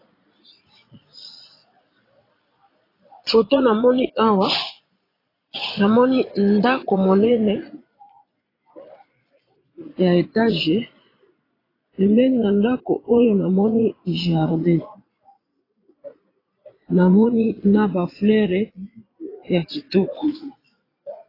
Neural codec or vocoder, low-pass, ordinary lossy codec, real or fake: vocoder, 22.05 kHz, 80 mel bands, Vocos; 5.4 kHz; AAC, 24 kbps; fake